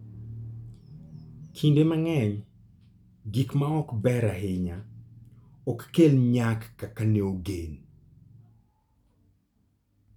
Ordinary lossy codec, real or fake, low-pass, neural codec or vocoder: none; real; 19.8 kHz; none